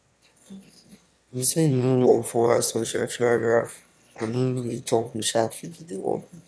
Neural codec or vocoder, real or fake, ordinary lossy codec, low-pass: autoencoder, 22.05 kHz, a latent of 192 numbers a frame, VITS, trained on one speaker; fake; none; none